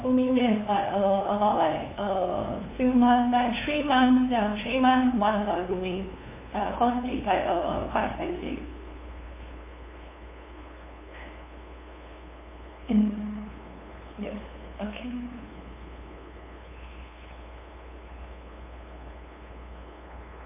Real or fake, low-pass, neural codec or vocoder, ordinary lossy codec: fake; 3.6 kHz; codec, 16 kHz, 2 kbps, FunCodec, trained on LibriTTS, 25 frames a second; MP3, 24 kbps